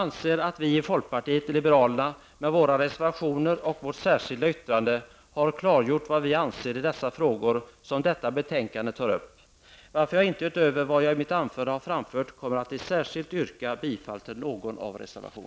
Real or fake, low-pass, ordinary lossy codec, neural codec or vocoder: real; none; none; none